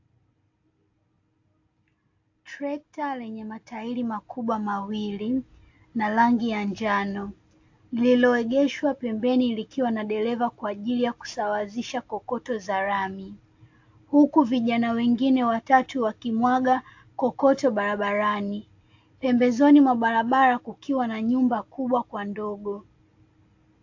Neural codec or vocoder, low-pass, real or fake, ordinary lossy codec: none; 7.2 kHz; real; AAC, 48 kbps